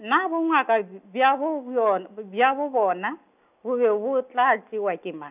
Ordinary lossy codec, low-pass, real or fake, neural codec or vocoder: none; 3.6 kHz; real; none